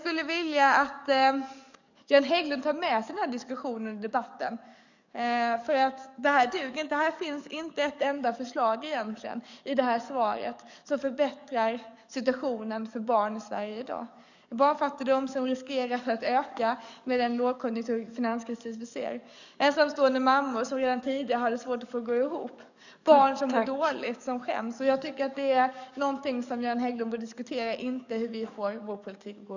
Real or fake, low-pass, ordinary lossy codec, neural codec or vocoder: fake; 7.2 kHz; none; codec, 44.1 kHz, 7.8 kbps, DAC